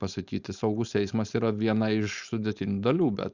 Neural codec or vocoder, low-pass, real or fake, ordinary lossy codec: codec, 16 kHz, 4.8 kbps, FACodec; 7.2 kHz; fake; Opus, 64 kbps